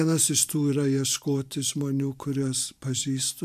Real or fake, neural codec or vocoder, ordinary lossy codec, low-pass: fake; vocoder, 44.1 kHz, 128 mel bands every 512 samples, BigVGAN v2; MP3, 96 kbps; 14.4 kHz